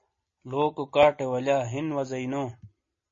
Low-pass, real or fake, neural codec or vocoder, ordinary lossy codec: 7.2 kHz; real; none; MP3, 32 kbps